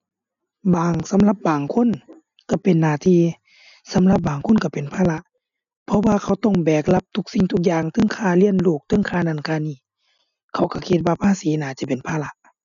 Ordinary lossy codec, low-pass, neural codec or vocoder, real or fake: none; 7.2 kHz; none; real